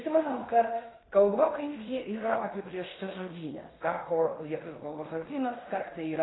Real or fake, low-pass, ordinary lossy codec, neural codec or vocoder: fake; 7.2 kHz; AAC, 16 kbps; codec, 16 kHz in and 24 kHz out, 0.9 kbps, LongCat-Audio-Codec, fine tuned four codebook decoder